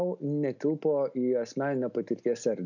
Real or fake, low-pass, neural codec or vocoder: real; 7.2 kHz; none